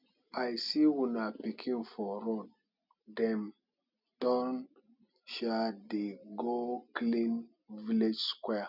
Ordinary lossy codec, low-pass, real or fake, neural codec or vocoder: none; 5.4 kHz; real; none